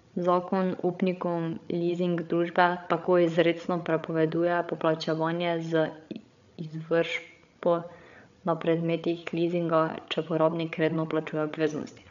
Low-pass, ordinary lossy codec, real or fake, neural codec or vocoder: 7.2 kHz; none; fake; codec, 16 kHz, 8 kbps, FreqCodec, larger model